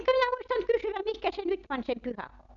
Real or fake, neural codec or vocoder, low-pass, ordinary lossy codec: real; none; 7.2 kHz; none